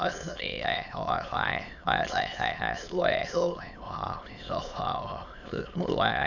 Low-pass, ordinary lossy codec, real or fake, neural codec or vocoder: 7.2 kHz; none; fake; autoencoder, 22.05 kHz, a latent of 192 numbers a frame, VITS, trained on many speakers